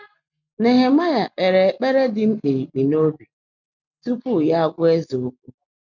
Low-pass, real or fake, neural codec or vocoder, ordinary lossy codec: 7.2 kHz; real; none; none